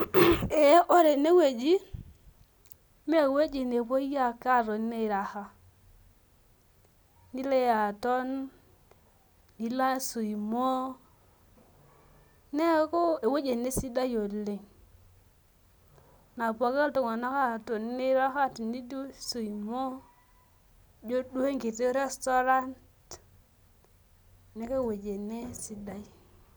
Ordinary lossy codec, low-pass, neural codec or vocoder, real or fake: none; none; none; real